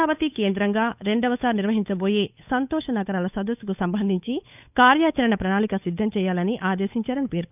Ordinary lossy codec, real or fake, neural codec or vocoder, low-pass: none; fake; codec, 16 kHz, 8 kbps, FunCodec, trained on Chinese and English, 25 frames a second; 3.6 kHz